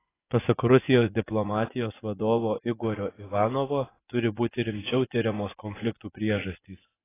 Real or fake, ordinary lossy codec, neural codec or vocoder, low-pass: fake; AAC, 16 kbps; vocoder, 24 kHz, 100 mel bands, Vocos; 3.6 kHz